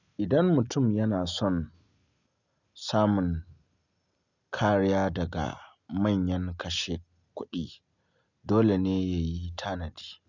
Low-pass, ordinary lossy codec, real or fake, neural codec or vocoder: 7.2 kHz; none; real; none